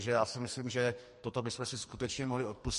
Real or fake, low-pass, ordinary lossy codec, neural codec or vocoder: fake; 14.4 kHz; MP3, 48 kbps; codec, 44.1 kHz, 2.6 kbps, SNAC